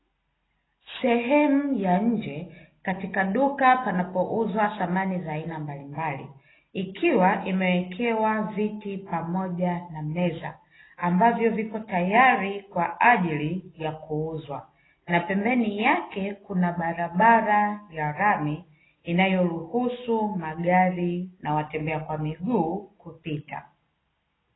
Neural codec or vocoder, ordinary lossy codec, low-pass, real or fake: none; AAC, 16 kbps; 7.2 kHz; real